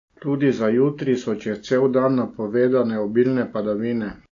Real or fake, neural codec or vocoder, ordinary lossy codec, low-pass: real; none; AAC, 48 kbps; 7.2 kHz